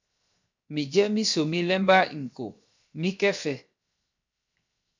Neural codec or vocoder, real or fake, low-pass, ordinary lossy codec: codec, 16 kHz, 0.7 kbps, FocalCodec; fake; 7.2 kHz; MP3, 64 kbps